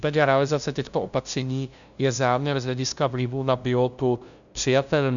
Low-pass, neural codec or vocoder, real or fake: 7.2 kHz; codec, 16 kHz, 0.5 kbps, FunCodec, trained on LibriTTS, 25 frames a second; fake